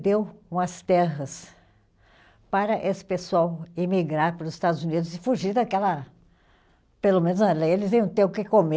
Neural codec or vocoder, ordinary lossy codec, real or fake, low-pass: none; none; real; none